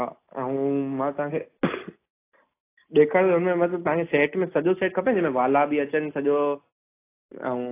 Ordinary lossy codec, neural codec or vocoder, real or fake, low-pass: AAC, 24 kbps; none; real; 3.6 kHz